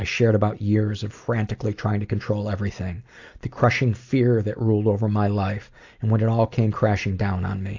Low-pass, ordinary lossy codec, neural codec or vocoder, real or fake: 7.2 kHz; AAC, 48 kbps; none; real